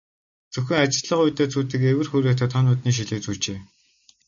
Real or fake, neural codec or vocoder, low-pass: real; none; 7.2 kHz